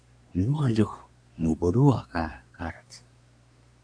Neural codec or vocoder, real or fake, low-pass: codec, 24 kHz, 1 kbps, SNAC; fake; 9.9 kHz